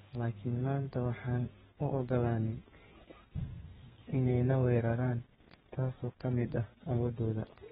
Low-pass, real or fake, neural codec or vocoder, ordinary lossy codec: 14.4 kHz; fake; codec, 32 kHz, 1.9 kbps, SNAC; AAC, 16 kbps